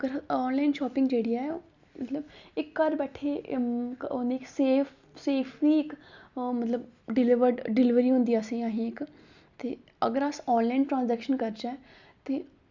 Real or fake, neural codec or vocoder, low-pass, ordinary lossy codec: real; none; 7.2 kHz; Opus, 64 kbps